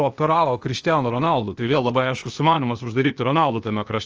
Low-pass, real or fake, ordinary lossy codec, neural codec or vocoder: 7.2 kHz; fake; Opus, 24 kbps; codec, 16 kHz, 0.8 kbps, ZipCodec